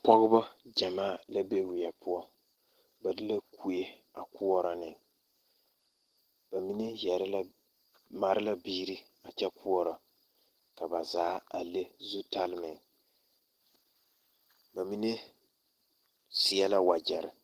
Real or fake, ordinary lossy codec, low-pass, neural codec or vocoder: real; Opus, 16 kbps; 14.4 kHz; none